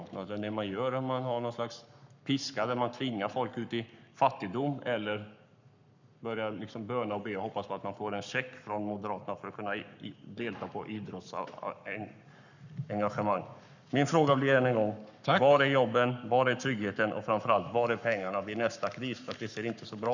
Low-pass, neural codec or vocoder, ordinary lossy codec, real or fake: 7.2 kHz; codec, 44.1 kHz, 7.8 kbps, Pupu-Codec; none; fake